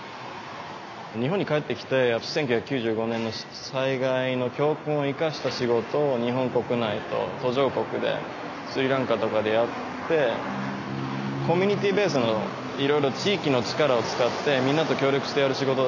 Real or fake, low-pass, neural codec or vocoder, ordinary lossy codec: real; 7.2 kHz; none; none